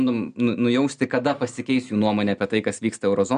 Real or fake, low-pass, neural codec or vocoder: real; 9.9 kHz; none